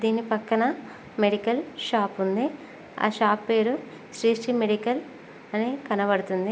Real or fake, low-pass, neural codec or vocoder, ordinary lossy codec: real; none; none; none